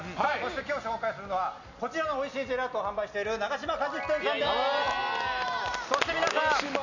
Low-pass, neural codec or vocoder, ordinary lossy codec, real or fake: 7.2 kHz; none; none; real